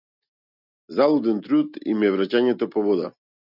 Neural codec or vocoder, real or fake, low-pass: none; real; 5.4 kHz